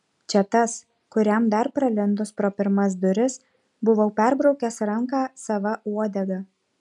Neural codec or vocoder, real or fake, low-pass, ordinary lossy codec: none; real; 10.8 kHz; MP3, 96 kbps